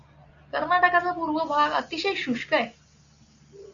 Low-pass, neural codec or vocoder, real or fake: 7.2 kHz; none; real